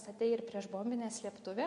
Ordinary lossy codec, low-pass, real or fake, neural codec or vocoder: MP3, 48 kbps; 10.8 kHz; real; none